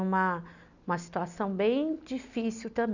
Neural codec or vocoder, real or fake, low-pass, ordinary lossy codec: none; real; 7.2 kHz; none